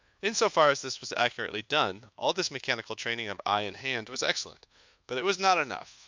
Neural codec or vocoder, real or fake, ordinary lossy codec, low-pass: codec, 24 kHz, 1.2 kbps, DualCodec; fake; MP3, 64 kbps; 7.2 kHz